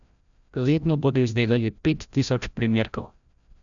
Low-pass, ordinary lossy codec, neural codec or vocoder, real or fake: 7.2 kHz; none; codec, 16 kHz, 0.5 kbps, FreqCodec, larger model; fake